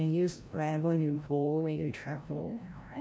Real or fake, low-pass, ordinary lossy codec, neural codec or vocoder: fake; none; none; codec, 16 kHz, 0.5 kbps, FreqCodec, larger model